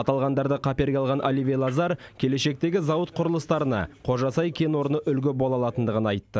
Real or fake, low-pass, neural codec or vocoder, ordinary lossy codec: real; none; none; none